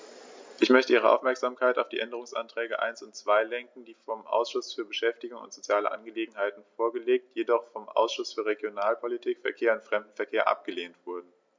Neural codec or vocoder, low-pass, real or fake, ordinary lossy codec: none; 7.2 kHz; real; MP3, 64 kbps